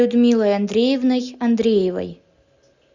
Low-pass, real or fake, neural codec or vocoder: 7.2 kHz; real; none